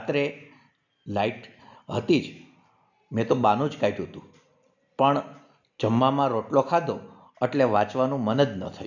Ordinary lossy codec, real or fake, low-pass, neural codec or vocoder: none; real; 7.2 kHz; none